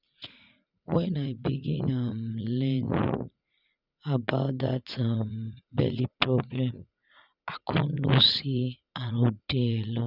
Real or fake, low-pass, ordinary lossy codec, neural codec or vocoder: fake; 5.4 kHz; none; vocoder, 44.1 kHz, 80 mel bands, Vocos